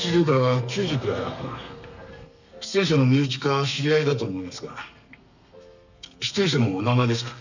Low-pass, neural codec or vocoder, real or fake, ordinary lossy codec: 7.2 kHz; codec, 32 kHz, 1.9 kbps, SNAC; fake; none